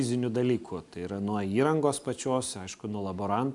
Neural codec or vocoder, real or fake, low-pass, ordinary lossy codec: none; real; 10.8 kHz; MP3, 96 kbps